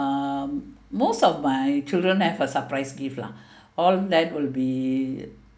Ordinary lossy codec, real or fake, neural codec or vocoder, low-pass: none; real; none; none